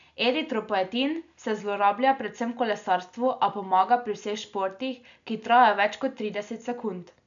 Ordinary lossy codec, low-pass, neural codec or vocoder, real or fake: MP3, 96 kbps; 7.2 kHz; none; real